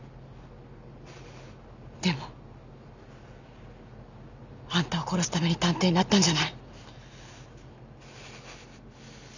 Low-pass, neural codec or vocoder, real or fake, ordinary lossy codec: 7.2 kHz; none; real; none